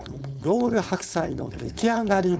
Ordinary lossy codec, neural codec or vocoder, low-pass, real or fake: none; codec, 16 kHz, 4.8 kbps, FACodec; none; fake